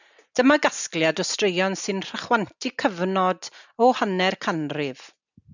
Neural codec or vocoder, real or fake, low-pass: none; real; 7.2 kHz